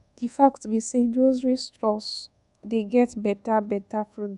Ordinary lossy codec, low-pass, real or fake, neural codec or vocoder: none; 10.8 kHz; fake; codec, 24 kHz, 1.2 kbps, DualCodec